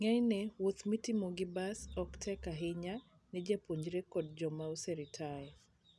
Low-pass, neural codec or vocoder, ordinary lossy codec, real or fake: none; none; none; real